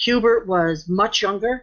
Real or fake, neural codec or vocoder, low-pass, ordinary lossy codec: real; none; 7.2 kHz; MP3, 64 kbps